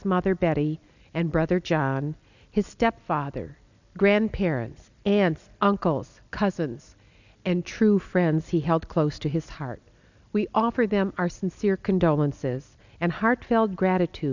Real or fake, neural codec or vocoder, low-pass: real; none; 7.2 kHz